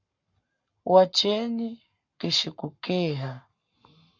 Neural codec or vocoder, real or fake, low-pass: codec, 44.1 kHz, 7.8 kbps, Pupu-Codec; fake; 7.2 kHz